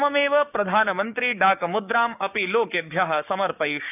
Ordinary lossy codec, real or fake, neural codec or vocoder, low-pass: none; fake; codec, 16 kHz, 6 kbps, DAC; 3.6 kHz